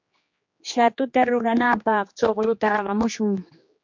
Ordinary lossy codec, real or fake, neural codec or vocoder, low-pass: MP3, 48 kbps; fake; codec, 16 kHz, 2 kbps, X-Codec, HuBERT features, trained on general audio; 7.2 kHz